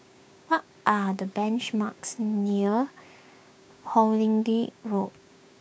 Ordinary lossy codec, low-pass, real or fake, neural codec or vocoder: none; none; fake; codec, 16 kHz, 6 kbps, DAC